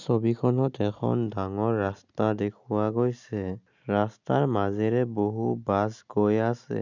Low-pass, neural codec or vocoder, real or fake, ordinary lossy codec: 7.2 kHz; none; real; none